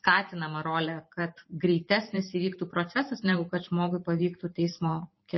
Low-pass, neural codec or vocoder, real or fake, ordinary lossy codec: 7.2 kHz; vocoder, 44.1 kHz, 128 mel bands every 512 samples, BigVGAN v2; fake; MP3, 24 kbps